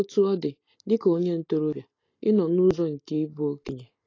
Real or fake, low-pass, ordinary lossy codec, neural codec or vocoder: real; 7.2 kHz; AAC, 32 kbps; none